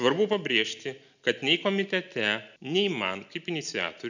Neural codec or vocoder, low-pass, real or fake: none; 7.2 kHz; real